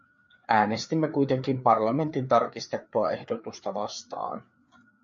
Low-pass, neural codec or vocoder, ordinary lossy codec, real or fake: 7.2 kHz; codec, 16 kHz, 4 kbps, FreqCodec, larger model; MP3, 48 kbps; fake